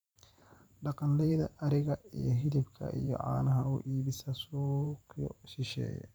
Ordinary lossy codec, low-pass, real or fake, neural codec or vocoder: none; none; fake; vocoder, 44.1 kHz, 128 mel bands every 512 samples, BigVGAN v2